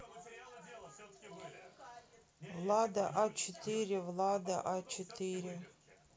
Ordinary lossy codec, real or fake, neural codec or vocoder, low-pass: none; real; none; none